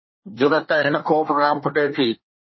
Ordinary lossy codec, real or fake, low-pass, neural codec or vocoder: MP3, 24 kbps; fake; 7.2 kHz; codec, 24 kHz, 1 kbps, SNAC